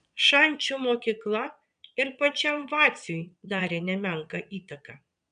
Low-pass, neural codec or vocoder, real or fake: 9.9 kHz; vocoder, 22.05 kHz, 80 mel bands, Vocos; fake